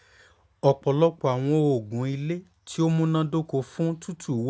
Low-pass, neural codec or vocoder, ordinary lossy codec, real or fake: none; none; none; real